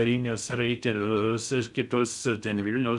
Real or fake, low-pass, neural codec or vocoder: fake; 10.8 kHz; codec, 16 kHz in and 24 kHz out, 0.6 kbps, FocalCodec, streaming, 4096 codes